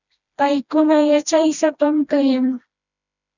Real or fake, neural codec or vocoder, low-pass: fake; codec, 16 kHz, 1 kbps, FreqCodec, smaller model; 7.2 kHz